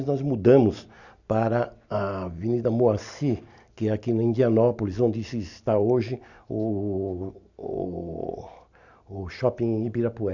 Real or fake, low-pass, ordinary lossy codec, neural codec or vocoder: real; 7.2 kHz; none; none